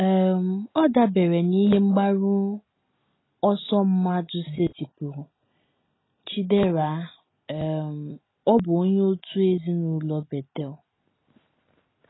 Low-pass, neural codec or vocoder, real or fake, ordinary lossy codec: 7.2 kHz; none; real; AAC, 16 kbps